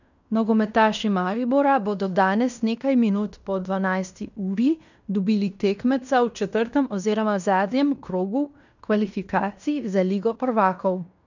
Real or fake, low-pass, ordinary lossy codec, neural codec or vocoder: fake; 7.2 kHz; none; codec, 16 kHz in and 24 kHz out, 0.9 kbps, LongCat-Audio-Codec, fine tuned four codebook decoder